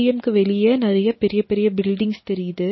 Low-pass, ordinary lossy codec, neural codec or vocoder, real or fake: 7.2 kHz; MP3, 24 kbps; none; real